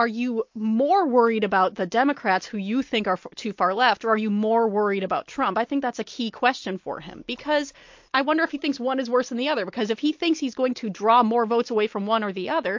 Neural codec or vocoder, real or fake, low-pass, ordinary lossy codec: none; real; 7.2 kHz; MP3, 48 kbps